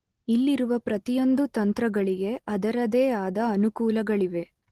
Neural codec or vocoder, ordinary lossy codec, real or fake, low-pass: none; Opus, 16 kbps; real; 14.4 kHz